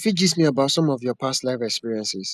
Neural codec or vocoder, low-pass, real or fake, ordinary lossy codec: none; 14.4 kHz; real; none